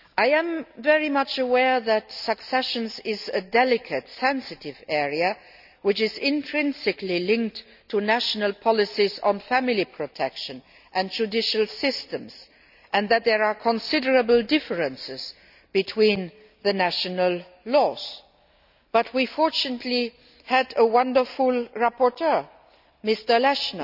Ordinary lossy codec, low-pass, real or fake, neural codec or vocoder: none; 5.4 kHz; real; none